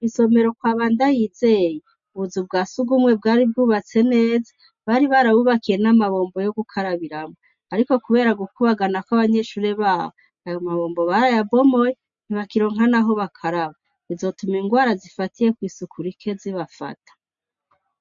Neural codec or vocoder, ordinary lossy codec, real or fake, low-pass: none; MP3, 48 kbps; real; 7.2 kHz